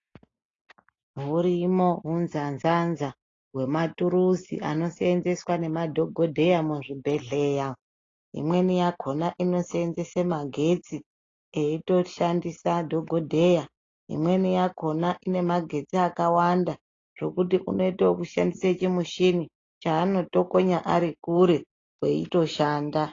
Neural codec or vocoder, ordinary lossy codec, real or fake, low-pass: none; AAC, 32 kbps; real; 7.2 kHz